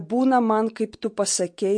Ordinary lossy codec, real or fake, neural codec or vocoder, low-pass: MP3, 48 kbps; real; none; 9.9 kHz